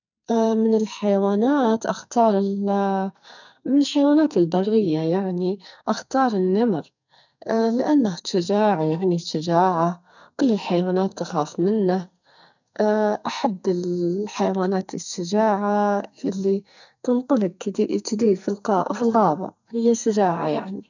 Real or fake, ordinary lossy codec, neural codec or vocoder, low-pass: fake; none; codec, 44.1 kHz, 2.6 kbps, SNAC; 7.2 kHz